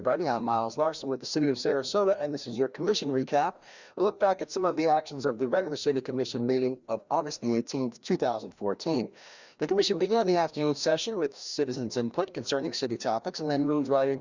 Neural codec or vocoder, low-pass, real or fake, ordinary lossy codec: codec, 16 kHz, 1 kbps, FreqCodec, larger model; 7.2 kHz; fake; Opus, 64 kbps